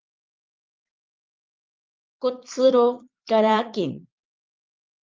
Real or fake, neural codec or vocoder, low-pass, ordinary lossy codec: fake; vocoder, 22.05 kHz, 80 mel bands, Vocos; 7.2 kHz; Opus, 32 kbps